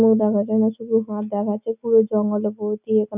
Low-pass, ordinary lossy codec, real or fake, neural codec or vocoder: 3.6 kHz; none; real; none